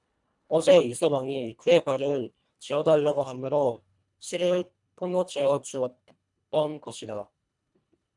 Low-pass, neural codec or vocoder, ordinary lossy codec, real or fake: 10.8 kHz; codec, 24 kHz, 1.5 kbps, HILCodec; MP3, 96 kbps; fake